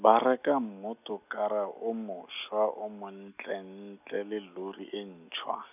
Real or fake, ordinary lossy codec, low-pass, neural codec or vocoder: real; none; 3.6 kHz; none